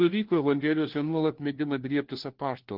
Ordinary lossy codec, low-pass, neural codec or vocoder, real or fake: Opus, 16 kbps; 5.4 kHz; codec, 16 kHz, 1 kbps, FunCodec, trained on LibriTTS, 50 frames a second; fake